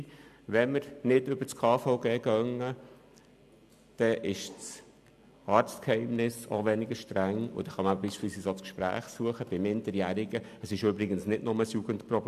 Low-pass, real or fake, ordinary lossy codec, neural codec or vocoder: 14.4 kHz; real; AAC, 96 kbps; none